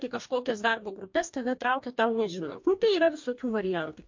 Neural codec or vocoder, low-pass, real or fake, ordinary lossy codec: codec, 44.1 kHz, 2.6 kbps, DAC; 7.2 kHz; fake; MP3, 48 kbps